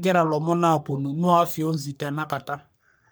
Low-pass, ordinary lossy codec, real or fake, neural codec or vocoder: none; none; fake; codec, 44.1 kHz, 3.4 kbps, Pupu-Codec